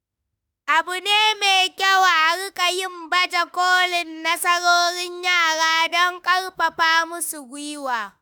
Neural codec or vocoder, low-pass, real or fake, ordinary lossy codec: autoencoder, 48 kHz, 32 numbers a frame, DAC-VAE, trained on Japanese speech; none; fake; none